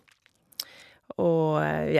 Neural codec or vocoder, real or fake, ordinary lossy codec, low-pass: none; real; none; 14.4 kHz